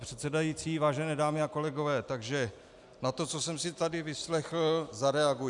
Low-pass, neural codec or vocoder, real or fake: 10.8 kHz; none; real